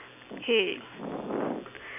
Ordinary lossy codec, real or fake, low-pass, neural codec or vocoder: none; real; 3.6 kHz; none